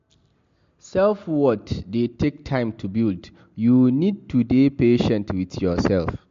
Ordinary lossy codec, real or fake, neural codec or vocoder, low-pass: MP3, 48 kbps; real; none; 7.2 kHz